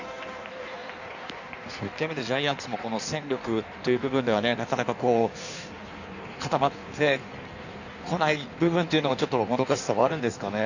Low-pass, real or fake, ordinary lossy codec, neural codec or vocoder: 7.2 kHz; fake; none; codec, 16 kHz in and 24 kHz out, 1.1 kbps, FireRedTTS-2 codec